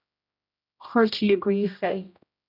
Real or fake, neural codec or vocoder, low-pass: fake; codec, 16 kHz, 0.5 kbps, X-Codec, HuBERT features, trained on general audio; 5.4 kHz